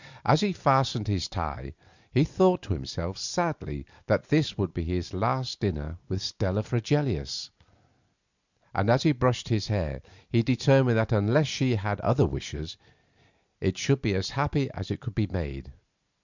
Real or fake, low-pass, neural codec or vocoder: real; 7.2 kHz; none